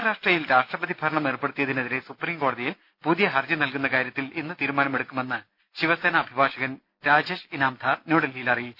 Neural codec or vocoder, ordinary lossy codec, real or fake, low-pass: none; none; real; 5.4 kHz